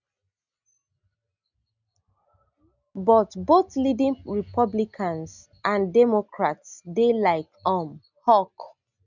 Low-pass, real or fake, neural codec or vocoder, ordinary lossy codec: 7.2 kHz; real; none; none